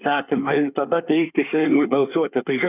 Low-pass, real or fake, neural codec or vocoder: 3.6 kHz; fake; codec, 24 kHz, 1 kbps, SNAC